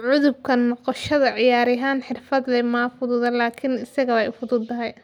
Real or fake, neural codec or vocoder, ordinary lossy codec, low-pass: real; none; MP3, 96 kbps; 19.8 kHz